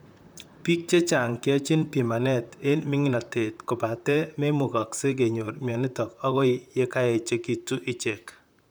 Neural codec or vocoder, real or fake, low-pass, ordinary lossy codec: vocoder, 44.1 kHz, 128 mel bands, Pupu-Vocoder; fake; none; none